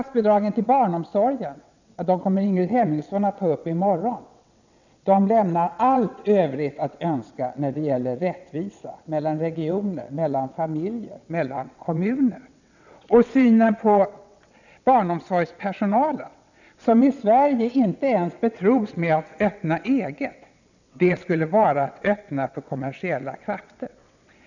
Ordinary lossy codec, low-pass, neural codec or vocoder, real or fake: none; 7.2 kHz; vocoder, 22.05 kHz, 80 mel bands, WaveNeXt; fake